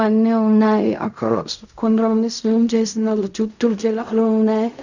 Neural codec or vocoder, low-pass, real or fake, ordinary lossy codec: codec, 16 kHz in and 24 kHz out, 0.4 kbps, LongCat-Audio-Codec, fine tuned four codebook decoder; 7.2 kHz; fake; none